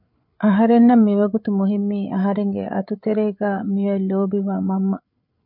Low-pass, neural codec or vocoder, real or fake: 5.4 kHz; codec, 16 kHz, 8 kbps, FreqCodec, larger model; fake